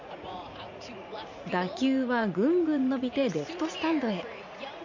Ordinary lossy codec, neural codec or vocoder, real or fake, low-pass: none; vocoder, 44.1 kHz, 80 mel bands, Vocos; fake; 7.2 kHz